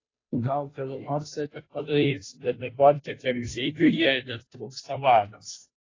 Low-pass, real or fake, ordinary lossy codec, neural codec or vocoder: 7.2 kHz; fake; AAC, 32 kbps; codec, 16 kHz, 0.5 kbps, FunCodec, trained on Chinese and English, 25 frames a second